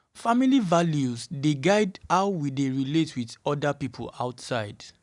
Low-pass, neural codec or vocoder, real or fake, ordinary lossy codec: 10.8 kHz; none; real; none